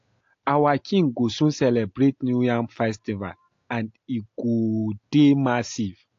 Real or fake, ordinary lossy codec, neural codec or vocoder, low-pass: real; AAC, 48 kbps; none; 7.2 kHz